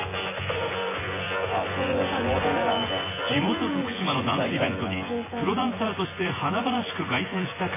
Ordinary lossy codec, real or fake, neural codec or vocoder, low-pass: MP3, 16 kbps; fake; vocoder, 24 kHz, 100 mel bands, Vocos; 3.6 kHz